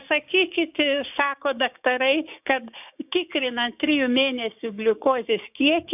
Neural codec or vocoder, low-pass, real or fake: codec, 16 kHz, 6 kbps, DAC; 3.6 kHz; fake